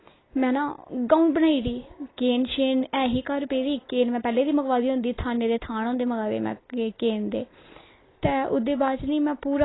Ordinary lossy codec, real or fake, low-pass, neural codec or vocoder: AAC, 16 kbps; real; 7.2 kHz; none